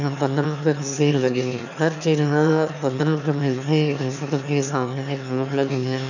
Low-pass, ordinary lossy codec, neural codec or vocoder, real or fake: 7.2 kHz; none; autoencoder, 22.05 kHz, a latent of 192 numbers a frame, VITS, trained on one speaker; fake